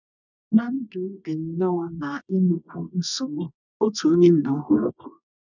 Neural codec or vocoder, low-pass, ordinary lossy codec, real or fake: codec, 24 kHz, 0.9 kbps, WavTokenizer, medium music audio release; 7.2 kHz; none; fake